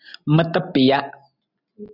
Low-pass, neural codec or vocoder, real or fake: 5.4 kHz; none; real